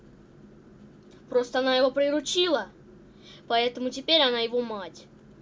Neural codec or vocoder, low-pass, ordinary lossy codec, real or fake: none; none; none; real